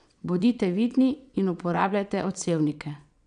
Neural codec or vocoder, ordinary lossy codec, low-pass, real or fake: vocoder, 22.05 kHz, 80 mel bands, WaveNeXt; none; 9.9 kHz; fake